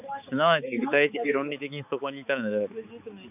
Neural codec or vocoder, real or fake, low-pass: codec, 16 kHz, 4 kbps, X-Codec, HuBERT features, trained on balanced general audio; fake; 3.6 kHz